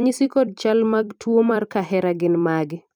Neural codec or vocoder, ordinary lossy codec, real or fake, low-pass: vocoder, 44.1 kHz, 128 mel bands every 256 samples, BigVGAN v2; none; fake; 19.8 kHz